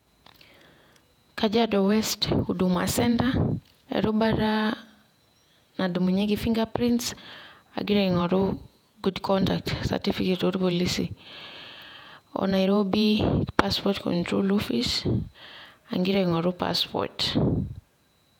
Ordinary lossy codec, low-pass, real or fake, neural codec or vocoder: none; 19.8 kHz; fake; vocoder, 48 kHz, 128 mel bands, Vocos